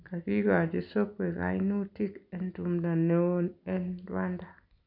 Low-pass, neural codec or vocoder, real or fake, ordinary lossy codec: 5.4 kHz; none; real; none